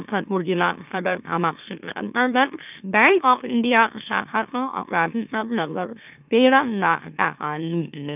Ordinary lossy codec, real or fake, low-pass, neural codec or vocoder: none; fake; 3.6 kHz; autoencoder, 44.1 kHz, a latent of 192 numbers a frame, MeloTTS